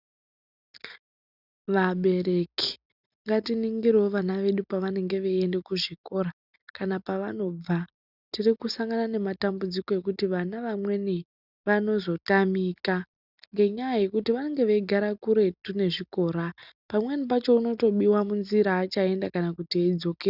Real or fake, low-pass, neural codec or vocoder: real; 5.4 kHz; none